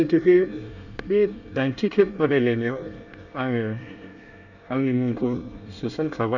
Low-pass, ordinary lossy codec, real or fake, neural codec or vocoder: 7.2 kHz; none; fake; codec, 24 kHz, 1 kbps, SNAC